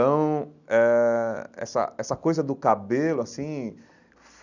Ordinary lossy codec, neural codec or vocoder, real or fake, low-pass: none; none; real; 7.2 kHz